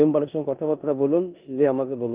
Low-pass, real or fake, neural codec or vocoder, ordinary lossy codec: 3.6 kHz; fake; codec, 16 kHz in and 24 kHz out, 0.9 kbps, LongCat-Audio-Codec, four codebook decoder; Opus, 32 kbps